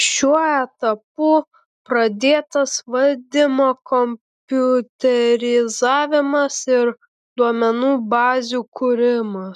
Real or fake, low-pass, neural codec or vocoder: real; 14.4 kHz; none